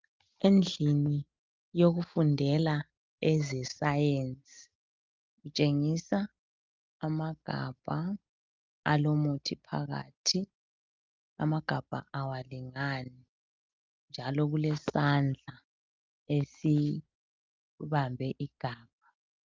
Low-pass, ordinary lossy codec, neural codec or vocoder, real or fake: 7.2 kHz; Opus, 24 kbps; none; real